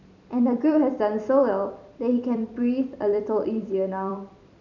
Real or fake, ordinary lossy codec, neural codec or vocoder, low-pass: fake; none; vocoder, 44.1 kHz, 128 mel bands every 256 samples, BigVGAN v2; 7.2 kHz